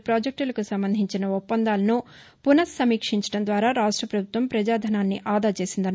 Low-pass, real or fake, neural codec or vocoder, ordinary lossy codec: none; real; none; none